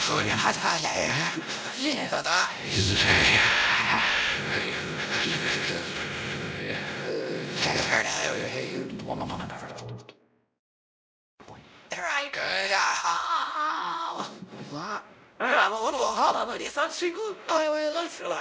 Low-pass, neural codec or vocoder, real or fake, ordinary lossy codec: none; codec, 16 kHz, 0.5 kbps, X-Codec, WavLM features, trained on Multilingual LibriSpeech; fake; none